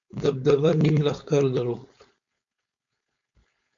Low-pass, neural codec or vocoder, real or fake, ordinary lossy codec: 7.2 kHz; codec, 16 kHz, 4.8 kbps, FACodec; fake; MP3, 48 kbps